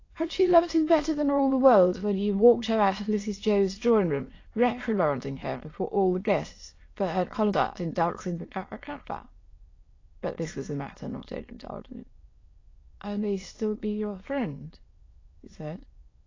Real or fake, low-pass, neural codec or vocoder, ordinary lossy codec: fake; 7.2 kHz; autoencoder, 22.05 kHz, a latent of 192 numbers a frame, VITS, trained on many speakers; AAC, 32 kbps